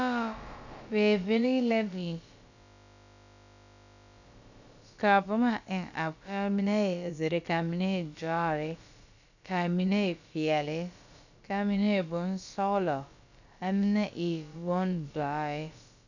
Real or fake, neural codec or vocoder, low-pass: fake; codec, 16 kHz, about 1 kbps, DyCAST, with the encoder's durations; 7.2 kHz